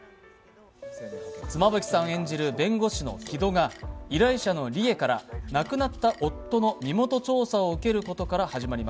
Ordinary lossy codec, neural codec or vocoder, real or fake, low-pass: none; none; real; none